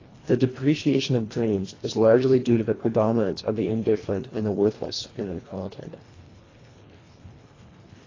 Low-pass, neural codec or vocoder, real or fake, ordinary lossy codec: 7.2 kHz; codec, 24 kHz, 1.5 kbps, HILCodec; fake; AAC, 32 kbps